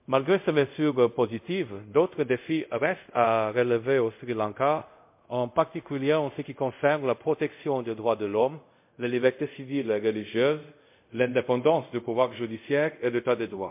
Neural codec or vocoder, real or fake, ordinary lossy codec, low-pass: codec, 24 kHz, 0.5 kbps, DualCodec; fake; MP3, 32 kbps; 3.6 kHz